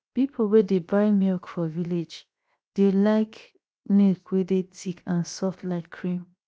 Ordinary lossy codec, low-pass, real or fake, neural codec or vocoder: none; none; fake; codec, 16 kHz, 0.7 kbps, FocalCodec